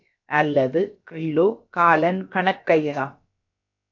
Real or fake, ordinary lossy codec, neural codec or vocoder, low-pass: fake; AAC, 48 kbps; codec, 16 kHz, about 1 kbps, DyCAST, with the encoder's durations; 7.2 kHz